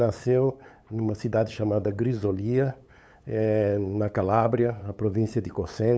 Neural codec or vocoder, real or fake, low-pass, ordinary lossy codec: codec, 16 kHz, 8 kbps, FunCodec, trained on LibriTTS, 25 frames a second; fake; none; none